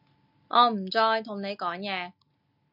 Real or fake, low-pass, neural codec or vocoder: real; 5.4 kHz; none